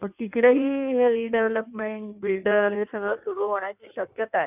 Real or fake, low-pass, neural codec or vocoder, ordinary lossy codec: fake; 3.6 kHz; codec, 16 kHz in and 24 kHz out, 1.1 kbps, FireRedTTS-2 codec; none